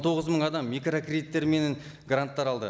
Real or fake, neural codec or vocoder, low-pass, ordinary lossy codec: real; none; none; none